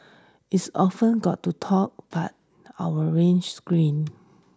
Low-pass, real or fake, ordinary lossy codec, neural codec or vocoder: none; real; none; none